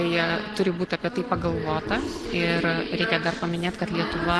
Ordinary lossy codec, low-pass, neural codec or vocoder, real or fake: Opus, 16 kbps; 10.8 kHz; none; real